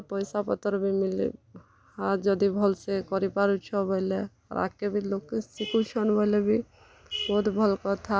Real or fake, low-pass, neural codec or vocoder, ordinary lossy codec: real; none; none; none